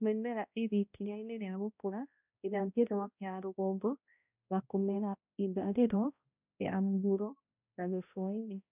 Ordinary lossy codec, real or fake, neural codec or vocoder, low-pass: none; fake; codec, 16 kHz, 0.5 kbps, X-Codec, HuBERT features, trained on balanced general audio; 3.6 kHz